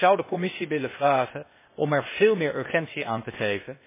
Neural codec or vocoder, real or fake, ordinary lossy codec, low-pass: codec, 16 kHz, 0.8 kbps, ZipCodec; fake; MP3, 16 kbps; 3.6 kHz